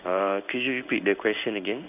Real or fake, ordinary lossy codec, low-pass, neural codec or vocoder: fake; none; 3.6 kHz; codec, 16 kHz in and 24 kHz out, 1 kbps, XY-Tokenizer